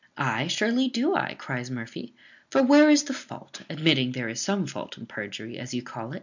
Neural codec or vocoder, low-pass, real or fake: none; 7.2 kHz; real